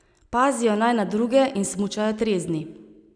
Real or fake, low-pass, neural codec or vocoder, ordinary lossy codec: real; 9.9 kHz; none; none